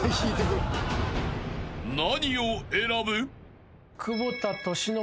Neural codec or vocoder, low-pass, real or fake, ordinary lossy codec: none; none; real; none